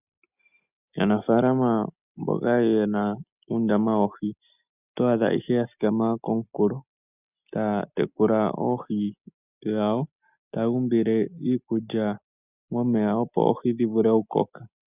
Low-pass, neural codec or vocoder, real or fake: 3.6 kHz; none; real